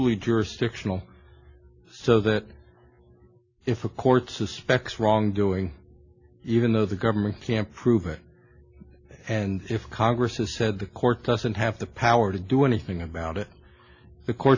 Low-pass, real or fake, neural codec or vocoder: 7.2 kHz; real; none